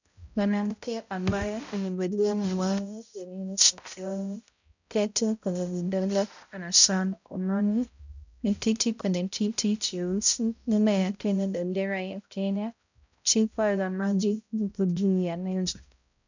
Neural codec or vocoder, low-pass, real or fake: codec, 16 kHz, 0.5 kbps, X-Codec, HuBERT features, trained on balanced general audio; 7.2 kHz; fake